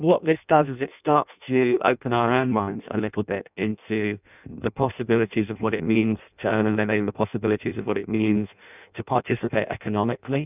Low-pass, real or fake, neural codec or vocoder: 3.6 kHz; fake; codec, 16 kHz in and 24 kHz out, 0.6 kbps, FireRedTTS-2 codec